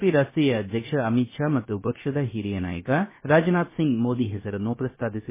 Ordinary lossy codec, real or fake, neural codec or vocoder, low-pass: MP3, 16 kbps; fake; codec, 16 kHz, 0.3 kbps, FocalCodec; 3.6 kHz